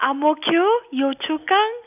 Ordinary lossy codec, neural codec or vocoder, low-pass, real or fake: none; none; 3.6 kHz; real